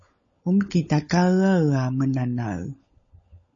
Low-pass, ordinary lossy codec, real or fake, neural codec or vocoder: 7.2 kHz; MP3, 32 kbps; fake; codec, 16 kHz, 16 kbps, FunCodec, trained on LibriTTS, 50 frames a second